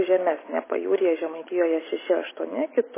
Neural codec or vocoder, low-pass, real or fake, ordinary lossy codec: none; 3.6 kHz; real; MP3, 16 kbps